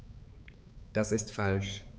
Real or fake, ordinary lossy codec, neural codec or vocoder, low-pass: fake; none; codec, 16 kHz, 4 kbps, X-Codec, HuBERT features, trained on balanced general audio; none